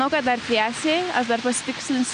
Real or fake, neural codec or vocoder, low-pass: real; none; 9.9 kHz